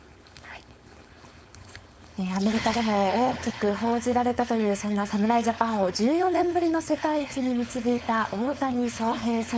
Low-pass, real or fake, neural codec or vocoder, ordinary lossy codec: none; fake; codec, 16 kHz, 4.8 kbps, FACodec; none